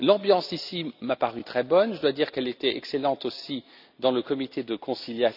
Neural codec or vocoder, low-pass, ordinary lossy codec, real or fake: none; 5.4 kHz; none; real